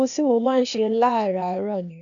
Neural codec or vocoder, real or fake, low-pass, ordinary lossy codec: codec, 16 kHz, 0.8 kbps, ZipCodec; fake; 7.2 kHz; none